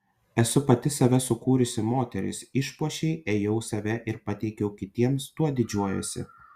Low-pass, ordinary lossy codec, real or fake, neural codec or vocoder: 14.4 kHz; Opus, 64 kbps; real; none